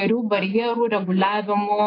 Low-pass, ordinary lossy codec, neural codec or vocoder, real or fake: 5.4 kHz; AAC, 24 kbps; none; real